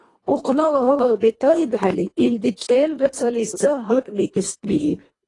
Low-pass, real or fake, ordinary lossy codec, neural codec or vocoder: 10.8 kHz; fake; AAC, 32 kbps; codec, 24 kHz, 1.5 kbps, HILCodec